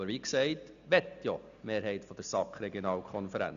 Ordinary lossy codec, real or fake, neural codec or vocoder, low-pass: none; real; none; 7.2 kHz